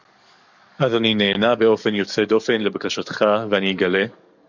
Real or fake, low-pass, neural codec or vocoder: fake; 7.2 kHz; codec, 44.1 kHz, 7.8 kbps, DAC